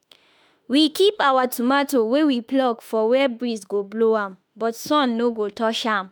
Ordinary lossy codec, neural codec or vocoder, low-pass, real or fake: none; autoencoder, 48 kHz, 32 numbers a frame, DAC-VAE, trained on Japanese speech; none; fake